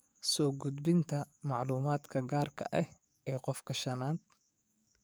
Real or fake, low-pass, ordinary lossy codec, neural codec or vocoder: fake; none; none; codec, 44.1 kHz, 7.8 kbps, DAC